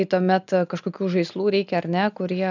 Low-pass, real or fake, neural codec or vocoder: 7.2 kHz; real; none